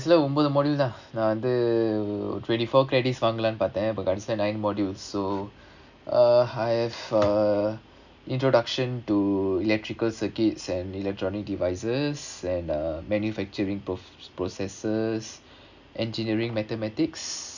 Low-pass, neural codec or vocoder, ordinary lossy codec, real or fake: 7.2 kHz; none; none; real